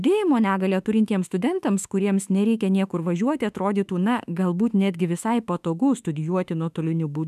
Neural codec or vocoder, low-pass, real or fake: autoencoder, 48 kHz, 32 numbers a frame, DAC-VAE, trained on Japanese speech; 14.4 kHz; fake